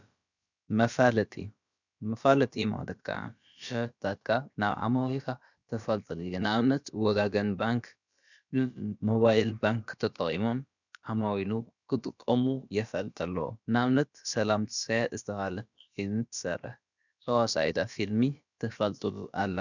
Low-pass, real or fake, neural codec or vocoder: 7.2 kHz; fake; codec, 16 kHz, about 1 kbps, DyCAST, with the encoder's durations